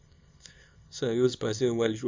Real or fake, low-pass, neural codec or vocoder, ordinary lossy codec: fake; 7.2 kHz; codec, 24 kHz, 0.9 kbps, WavTokenizer, small release; MP3, 64 kbps